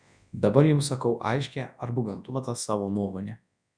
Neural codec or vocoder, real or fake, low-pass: codec, 24 kHz, 0.9 kbps, WavTokenizer, large speech release; fake; 9.9 kHz